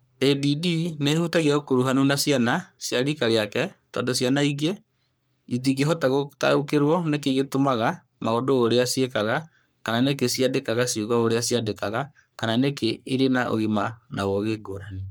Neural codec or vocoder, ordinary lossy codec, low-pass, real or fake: codec, 44.1 kHz, 3.4 kbps, Pupu-Codec; none; none; fake